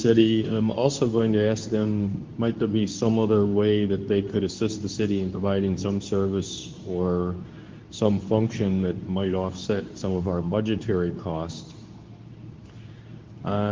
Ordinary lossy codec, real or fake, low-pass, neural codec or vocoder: Opus, 32 kbps; fake; 7.2 kHz; codec, 24 kHz, 0.9 kbps, WavTokenizer, medium speech release version 2